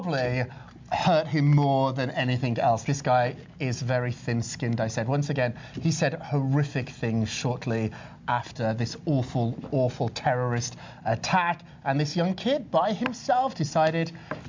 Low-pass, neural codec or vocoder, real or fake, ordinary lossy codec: 7.2 kHz; none; real; MP3, 64 kbps